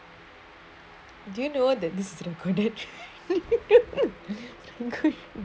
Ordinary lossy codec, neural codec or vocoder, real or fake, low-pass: none; none; real; none